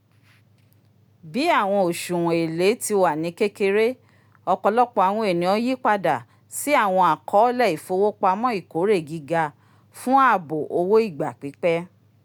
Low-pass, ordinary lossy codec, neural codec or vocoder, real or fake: none; none; none; real